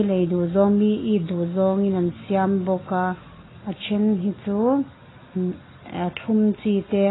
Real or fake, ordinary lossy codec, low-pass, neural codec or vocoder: real; AAC, 16 kbps; 7.2 kHz; none